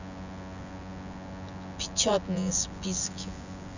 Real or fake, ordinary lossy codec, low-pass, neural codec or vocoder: fake; none; 7.2 kHz; vocoder, 24 kHz, 100 mel bands, Vocos